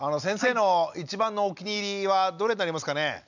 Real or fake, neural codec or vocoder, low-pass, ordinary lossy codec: real; none; 7.2 kHz; none